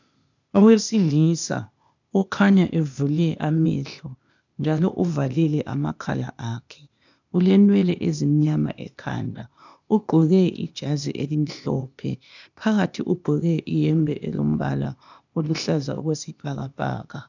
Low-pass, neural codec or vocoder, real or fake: 7.2 kHz; codec, 16 kHz, 0.8 kbps, ZipCodec; fake